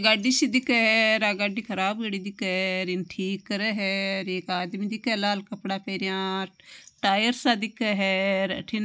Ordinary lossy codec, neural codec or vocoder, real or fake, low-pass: none; none; real; none